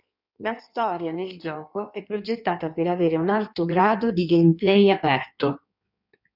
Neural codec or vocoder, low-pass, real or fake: codec, 16 kHz in and 24 kHz out, 1.1 kbps, FireRedTTS-2 codec; 5.4 kHz; fake